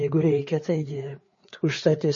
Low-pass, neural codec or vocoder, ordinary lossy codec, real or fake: 7.2 kHz; codec, 16 kHz, 4 kbps, FreqCodec, larger model; MP3, 32 kbps; fake